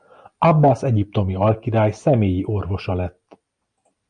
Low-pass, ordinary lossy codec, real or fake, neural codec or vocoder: 9.9 kHz; Opus, 64 kbps; real; none